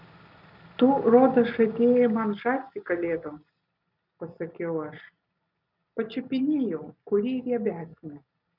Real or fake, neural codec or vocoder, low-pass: real; none; 5.4 kHz